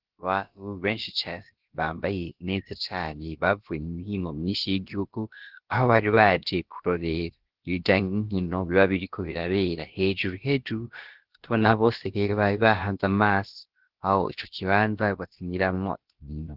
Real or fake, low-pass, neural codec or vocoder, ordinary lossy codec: fake; 5.4 kHz; codec, 16 kHz, about 1 kbps, DyCAST, with the encoder's durations; Opus, 16 kbps